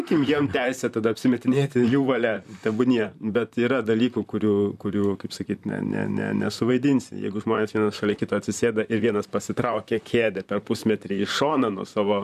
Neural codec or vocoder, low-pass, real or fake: vocoder, 44.1 kHz, 128 mel bands, Pupu-Vocoder; 14.4 kHz; fake